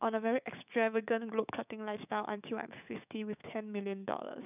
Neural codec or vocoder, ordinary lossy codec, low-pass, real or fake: codec, 16 kHz, 6 kbps, DAC; none; 3.6 kHz; fake